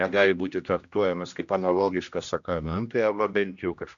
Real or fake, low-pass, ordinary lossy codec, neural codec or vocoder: fake; 7.2 kHz; MP3, 64 kbps; codec, 16 kHz, 1 kbps, X-Codec, HuBERT features, trained on general audio